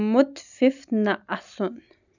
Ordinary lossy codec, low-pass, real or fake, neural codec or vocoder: none; 7.2 kHz; real; none